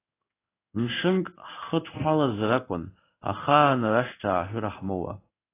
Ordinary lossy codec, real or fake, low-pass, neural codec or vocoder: AAC, 24 kbps; fake; 3.6 kHz; codec, 16 kHz in and 24 kHz out, 1 kbps, XY-Tokenizer